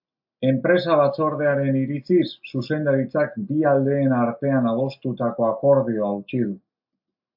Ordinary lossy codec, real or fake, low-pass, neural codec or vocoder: AAC, 48 kbps; real; 5.4 kHz; none